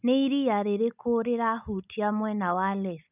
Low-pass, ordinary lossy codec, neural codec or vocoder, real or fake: 3.6 kHz; none; none; real